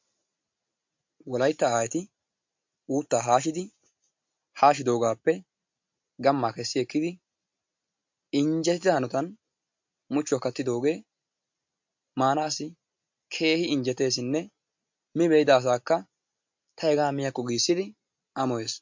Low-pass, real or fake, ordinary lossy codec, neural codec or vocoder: 7.2 kHz; real; MP3, 48 kbps; none